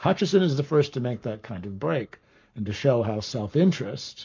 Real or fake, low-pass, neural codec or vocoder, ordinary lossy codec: fake; 7.2 kHz; codec, 44.1 kHz, 7.8 kbps, Pupu-Codec; MP3, 48 kbps